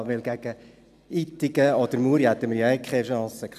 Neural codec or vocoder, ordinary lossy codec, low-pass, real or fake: none; none; 14.4 kHz; real